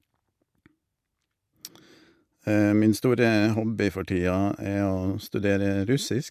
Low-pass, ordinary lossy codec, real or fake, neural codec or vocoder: 14.4 kHz; MP3, 96 kbps; real; none